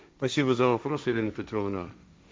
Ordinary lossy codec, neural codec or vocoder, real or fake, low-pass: none; codec, 16 kHz, 1.1 kbps, Voila-Tokenizer; fake; none